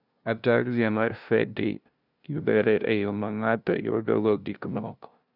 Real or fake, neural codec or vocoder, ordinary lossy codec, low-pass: fake; codec, 16 kHz, 0.5 kbps, FunCodec, trained on LibriTTS, 25 frames a second; none; 5.4 kHz